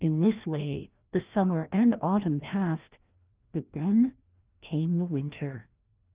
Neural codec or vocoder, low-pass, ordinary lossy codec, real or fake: codec, 16 kHz, 1 kbps, FreqCodec, larger model; 3.6 kHz; Opus, 32 kbps; fake